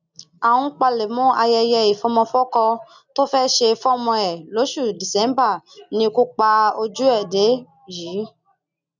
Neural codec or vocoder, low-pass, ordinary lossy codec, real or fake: none; 7.2 kHz; none; real